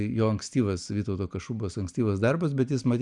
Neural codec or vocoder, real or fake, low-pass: none; real; 10.8 kHz